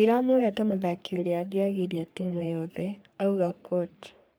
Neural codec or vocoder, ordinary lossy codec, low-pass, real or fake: codec, 44.1 kHz, 3.4 kbps, Pupu-Codec; none; none; fake